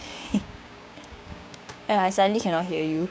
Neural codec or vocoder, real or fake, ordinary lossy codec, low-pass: codec, 16 kHz, 6 kbps, DAC; fake; none; none